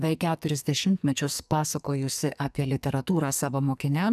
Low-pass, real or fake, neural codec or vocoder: 14.4 kHz; fake; codec, 44.1 kHz, 2.6 kbps, SNAC